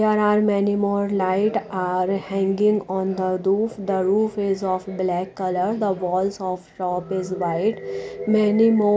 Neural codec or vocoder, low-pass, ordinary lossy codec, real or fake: none; none; none; real